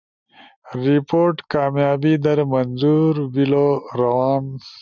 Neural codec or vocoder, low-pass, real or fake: none; 7.2 kHz; real